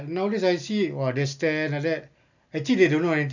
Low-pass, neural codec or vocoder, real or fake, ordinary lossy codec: 7.2 kHz; none; real; none